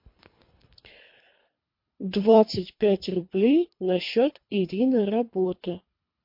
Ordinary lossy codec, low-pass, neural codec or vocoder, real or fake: MP3, 32 kbps; 5.4 kHz; codec, 24 kHz, 3 kbps, HILCodec; fake